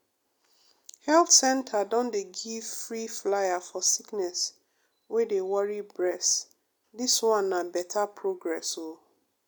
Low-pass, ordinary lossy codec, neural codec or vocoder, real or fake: none; none; none; real